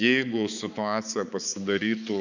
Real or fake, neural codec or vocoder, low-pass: fake; codec, 16 kHz, 4 kbps, X-Codec, HuBERT features, trained on balanced general audio; 7.2 kHz